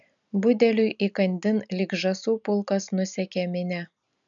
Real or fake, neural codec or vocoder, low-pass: real; none; 7.2 kHz